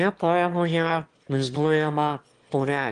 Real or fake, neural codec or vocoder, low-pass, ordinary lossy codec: fake; autoencoder, 22.05 kHz, a latent of 192 numbers a frame, VITS, trained on one speaker; 9.9 kHz; Opus, 24 kbps